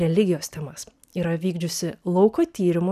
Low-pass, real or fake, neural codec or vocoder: 14.4 kHz; real; none